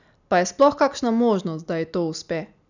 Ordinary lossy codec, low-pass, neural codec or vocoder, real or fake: none; 7.2 kHz; none; real